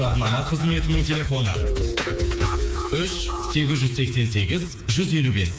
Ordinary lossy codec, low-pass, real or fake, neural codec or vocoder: none; none; fake; codec, 16 kHz, 4 kbps, FreqCodec, smaller model